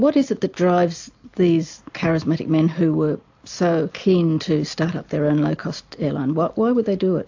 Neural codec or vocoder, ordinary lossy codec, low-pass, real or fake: none; AAC, 48 kbps; 7.2 kHz; real